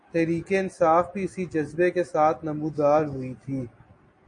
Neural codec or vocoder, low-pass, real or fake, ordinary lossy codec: none; 9.9 kHz; real; MP3, 48 kbps